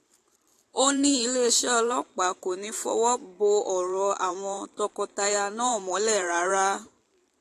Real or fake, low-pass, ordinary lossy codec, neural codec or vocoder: fake; 14.4 kHz; AAC, 48 kbps; vocoder, 44.1 kHz, 128 mel bands every 512 samples, BigVGAN v2